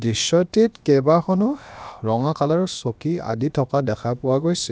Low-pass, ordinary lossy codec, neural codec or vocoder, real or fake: none; none; codec, 16 kHz, about 1 kbps, DyCAST, with the encoder's durations; fake